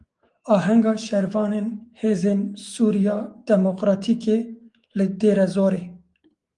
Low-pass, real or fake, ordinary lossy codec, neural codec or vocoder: 10.8 kHz; fake; Opus, 24 kbps; autoencoder, 48 kHz, 128 numbers a frame, DAC-VAE, trained on Japanese speech